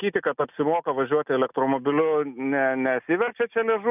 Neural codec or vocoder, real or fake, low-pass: none; real; 3.6 kHz